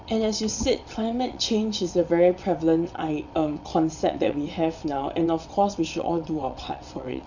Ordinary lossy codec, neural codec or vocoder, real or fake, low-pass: none; vocoder, 22.05 kHz, 80 mel bands, WaveNeXt; fake; 7.2 kHz